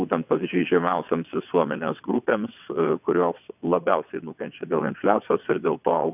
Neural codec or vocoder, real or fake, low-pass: vocoder, 22.05 kHz, 80 mel bands, WaveNeXt; fake; 3.6 kHz